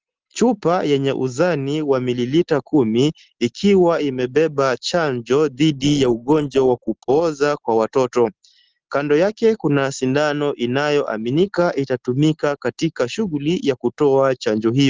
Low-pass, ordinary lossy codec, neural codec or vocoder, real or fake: 7.2 kHz; Opus, 16 kbps; none; real